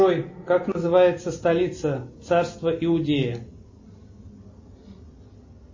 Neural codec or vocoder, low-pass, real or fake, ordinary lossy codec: none; 7.2 kHz; real; MP3, 32 kbps